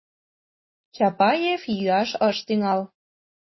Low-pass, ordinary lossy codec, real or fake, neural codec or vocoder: 7.2 kHz; MP3, 24 kbps; real; none